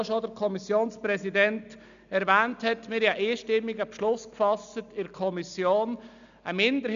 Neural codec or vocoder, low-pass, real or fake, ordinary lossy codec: none; 7.2 kHz; real; none